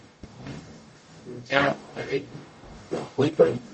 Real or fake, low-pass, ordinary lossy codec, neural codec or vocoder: fake; 10.8 kHz; MP3, 32 kbps; codec, 44.1 kHz, 0.9 kbps, DAC